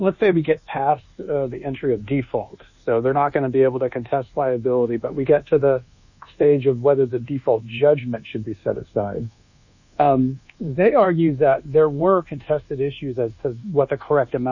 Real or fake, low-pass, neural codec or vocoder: fake; 7.2 kHz; codec, 24 kHz, 1.2 kbps, DualCodec